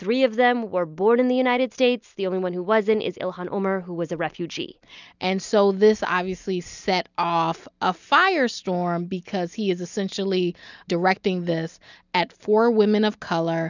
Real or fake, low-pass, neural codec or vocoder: real; 7.2 kHz; none